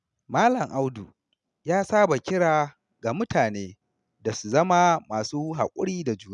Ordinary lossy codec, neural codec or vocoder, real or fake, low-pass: none; none; real; 10.8 kHz